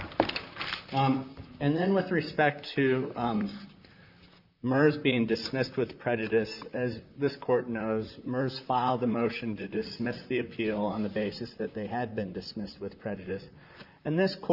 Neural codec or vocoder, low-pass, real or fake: vocoder, 44.1 kHz, 128 mel bands, Pupu-Vocoder; 5.4 kHz; fake